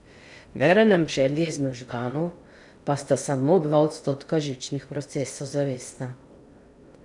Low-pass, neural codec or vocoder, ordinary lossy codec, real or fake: 10.8 kHz; codec, 16 kHz in and 24 kHz out, 0.6 kbps, FocalCodec, streaming, 2048 codes; none; fake